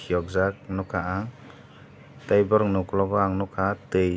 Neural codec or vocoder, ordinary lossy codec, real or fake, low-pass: none; none; real; none